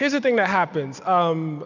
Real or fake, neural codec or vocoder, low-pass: real; none; 7.2 kHz